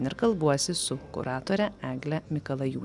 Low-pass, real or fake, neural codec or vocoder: 10.8 kHz; real; none